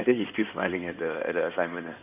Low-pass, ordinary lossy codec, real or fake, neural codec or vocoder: 3.6 kHz; none; fake; codec, 16 kHz in and 24 kHz out, 2.2 kbps, FireRedTTS-2 codec